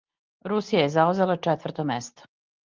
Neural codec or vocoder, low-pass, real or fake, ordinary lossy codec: none; 7.2 kHz; real; Opus, 32 kbps